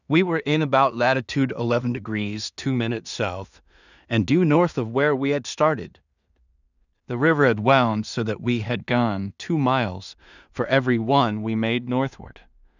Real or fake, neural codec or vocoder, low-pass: fake; codec, 16 kHz in and 24 kHz out, 0.4 kbps, LongCat-Audio-Codec, two codebook decoder; 7.2 kHz